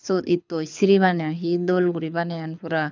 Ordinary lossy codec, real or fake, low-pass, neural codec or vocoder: none; fake; 7.2 kHz; codec, 24 kHz, 6 kbps, HILCodec